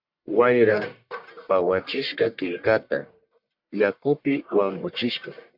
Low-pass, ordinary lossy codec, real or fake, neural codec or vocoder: 5.4 kHz; MP3, 48 kbps; fake; codec, 44.1 kHz, 1.7 kbps, Pupu-Codec